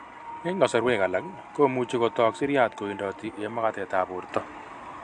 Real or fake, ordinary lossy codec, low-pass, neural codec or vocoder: real; AAC, 64 kbps; 9.9 kHz; none